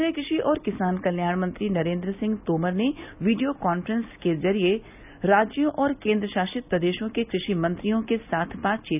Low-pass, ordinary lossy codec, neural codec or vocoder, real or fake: 3.6 kHz; none; none; real